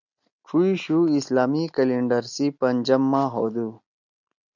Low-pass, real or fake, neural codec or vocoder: 7.2 kHz; real; none